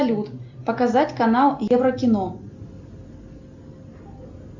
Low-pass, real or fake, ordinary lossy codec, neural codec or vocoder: 7.2 kHz; real; Opus, 64 kbps; none